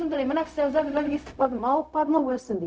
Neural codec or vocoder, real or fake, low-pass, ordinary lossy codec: codec, 16 kHz, 0.4 kbps, LongCat-Audio-Codec; fake; none; none